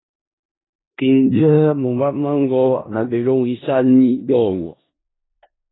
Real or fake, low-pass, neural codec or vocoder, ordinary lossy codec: fake; 7.2 kHz; codec, 16 kHz in and 24 kHz out, 0.4 kbps, LongCat-Audio-Codec, four codebook decoder; AAC, 16 kbps